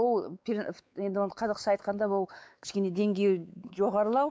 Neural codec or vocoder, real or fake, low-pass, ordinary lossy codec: codec, 16 kHz, 4 kbps, X-Codec, WavLM features, trained on Multilingual LibriSpeech; fake; none; none